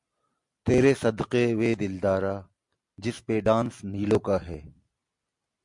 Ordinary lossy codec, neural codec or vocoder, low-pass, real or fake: MP3, 64 kbps; none; 10.8 kHz; real